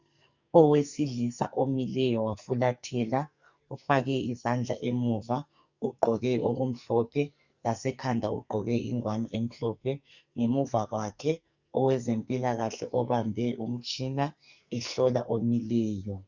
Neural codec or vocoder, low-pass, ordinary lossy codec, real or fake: codec, 44.1 kHz, 2.6 kbps, SNAC; 7.2 kHz; Opus, 64 kbps; fake